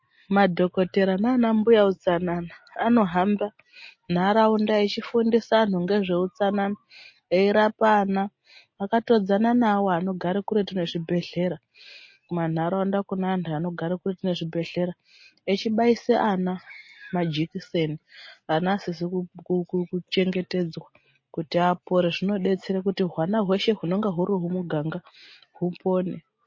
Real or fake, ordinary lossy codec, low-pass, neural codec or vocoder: real; MP3, 32 kbps; 7.2 kHz; none